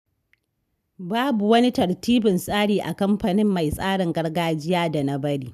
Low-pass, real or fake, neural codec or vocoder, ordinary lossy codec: 14.4 kHz; real; none; none